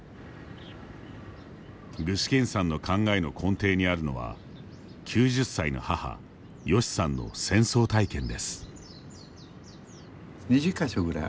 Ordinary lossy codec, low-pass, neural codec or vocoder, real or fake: none; none; none; real